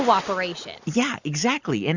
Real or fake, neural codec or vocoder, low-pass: real; none; 7.2 kHz